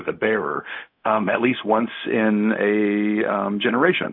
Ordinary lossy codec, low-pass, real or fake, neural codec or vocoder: MP3, 48 kbps; 5.4 kHz; fake; codec, 16 kHz, 0.4 kbps, LongCat-Audio-Codec